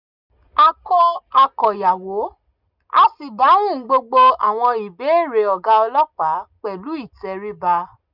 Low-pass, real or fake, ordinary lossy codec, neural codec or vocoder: 5.4 kHz; real; none; none